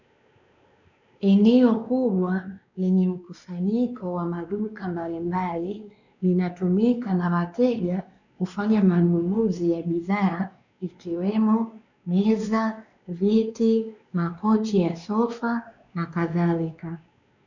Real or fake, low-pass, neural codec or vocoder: fake; 7.2 kHz; codec, 16 kHz, 2 kbps, X-Codec, WavLM features, trained on Multilingual LibriSpeech